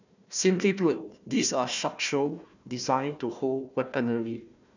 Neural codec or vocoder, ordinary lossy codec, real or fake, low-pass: codec, 16 kHz, 1 kbps, FunCodec, trained on Chinese and English, 50 frames a second; none; fake; 7.2 kHz